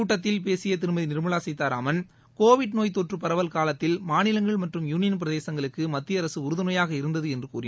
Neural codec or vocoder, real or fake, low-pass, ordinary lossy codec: none; real; none; none